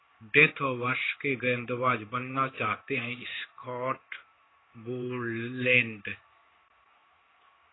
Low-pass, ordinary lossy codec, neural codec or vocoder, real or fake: 7.2 kHz; AAC, 16 kbps; codec, 16 kHz in and 24 kHz out, 1 kbps, XY-Tokenizer; fake